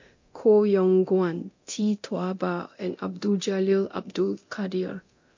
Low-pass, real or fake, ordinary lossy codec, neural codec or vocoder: 7.2 kHz; fake; MP3, 48 kbps; codec, 24 kHz, 0.9 kbps, DualCodec